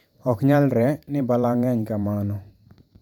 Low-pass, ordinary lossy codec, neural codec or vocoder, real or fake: 19.8 kHz; none; vocoder, 44.1 kHz, 128 mel bands every 512 samples, BigVGAN v2; fake